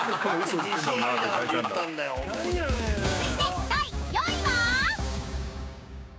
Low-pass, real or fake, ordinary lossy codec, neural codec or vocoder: none; fake; none; codec, 16 kHz, 6 kbps, DAC